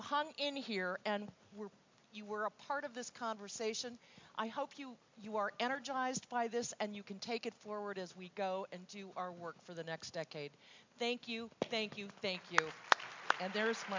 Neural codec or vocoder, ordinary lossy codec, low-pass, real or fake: none; MP3, 64 kbps; 7.2 kHz; real